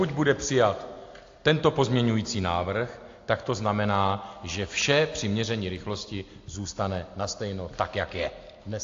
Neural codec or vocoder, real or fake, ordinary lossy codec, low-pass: none; real; AAC, 48 kbps; 7.2 kHz